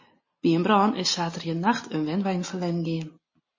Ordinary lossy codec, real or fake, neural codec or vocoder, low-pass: MP3, 32 kbps; real; none; 7.2 kHz